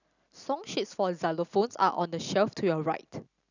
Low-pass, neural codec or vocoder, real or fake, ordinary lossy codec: 7.2 kHz; none; real; none